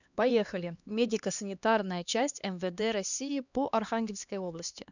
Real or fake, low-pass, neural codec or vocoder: fake; 7.2 kHz; codec, 16 kHz, 2 kbps, X-Codec, HuBERT features, trained on LibriSpeech